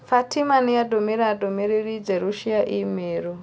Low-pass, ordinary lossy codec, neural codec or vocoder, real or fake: none; none; none; real